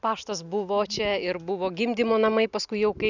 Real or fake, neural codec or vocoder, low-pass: real; none; 7.2 kHz